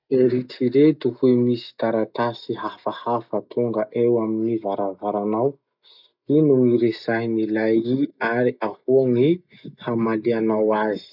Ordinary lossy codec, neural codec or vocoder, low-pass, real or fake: none; none; 5.4 kHz; real